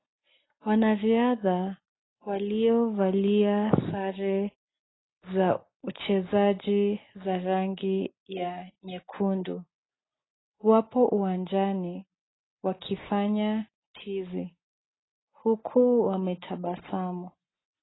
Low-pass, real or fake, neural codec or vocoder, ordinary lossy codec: 7.2 kHz; real; none; AAC, 16 kbps